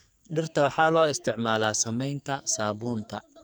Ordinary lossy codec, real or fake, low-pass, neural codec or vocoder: none; fake; none; codec, 44.1 kHz, 2.6 kbps, SNAC